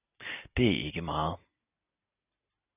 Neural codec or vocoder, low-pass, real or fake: none; 3.6 kHz; real